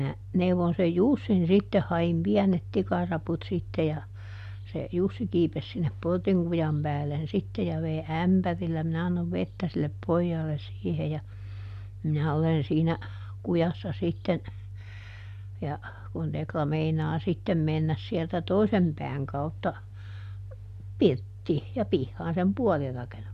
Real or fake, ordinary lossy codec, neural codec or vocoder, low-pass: real; MP3, 96 kbps; none; 14.4 kHz